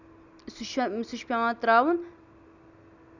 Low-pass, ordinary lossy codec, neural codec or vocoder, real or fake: 7.2 kHz; none; none; real